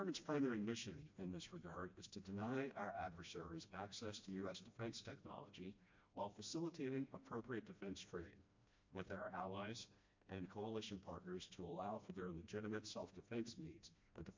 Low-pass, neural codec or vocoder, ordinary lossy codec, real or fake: 7.2 kHz; codec, 16 kHz, 1 kbps, FreqCodec, smaller model; MP3, 48 kbps; fake